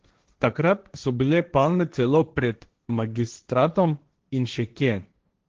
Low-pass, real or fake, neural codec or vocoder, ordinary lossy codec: 7.2 kHz; fake; codec, 16 kHz, 1.1 kbps, Voila-Tokenizer; Opus, 16 kbps